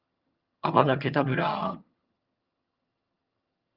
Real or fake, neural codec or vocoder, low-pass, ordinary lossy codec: fake; vocoder, 22.05 kHz, 80 mel bands, HiFi-GAN; 5.4 kHz; Opus, 24 kbps